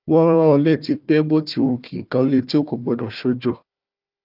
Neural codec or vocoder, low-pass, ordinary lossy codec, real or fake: codec, 16 kHz, 1 kbps, FunCodec, trained on Chinese and English, 50 frames a second; 5.4 kHz; Opus, 24 kbps; fake